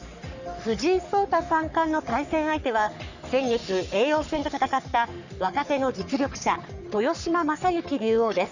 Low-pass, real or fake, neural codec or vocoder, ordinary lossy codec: 7.2 kHz; fake; codec, 44.1 kHz, 3.4 kbps, Pupu-Codec; none